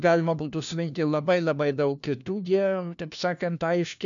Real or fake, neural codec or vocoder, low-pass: fake; codec, 16 kHz, 1 kbps, FunCodec, trained on LibriTTS, 50 frames a second; 7.2 kHz